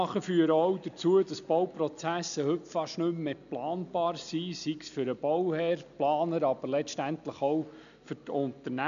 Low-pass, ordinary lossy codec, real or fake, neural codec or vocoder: 7.2 kHz; none; real; none